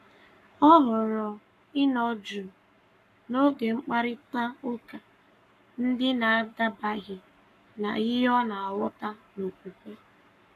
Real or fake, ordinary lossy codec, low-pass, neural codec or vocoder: fake; none; 14.4 kHz; codec, 44.1 kHz, 7.8 kbps, Pupu-Codec